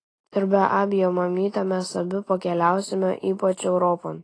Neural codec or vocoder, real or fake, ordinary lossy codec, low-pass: none; real; AAC, 32 kbps; 9.9 kHz